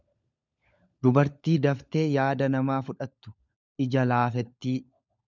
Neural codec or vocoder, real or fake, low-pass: codec, 16 kHz, 16 kbps, FunCodec, trained on LibriTTS, 50 frames a second; fake; 7.2 kHz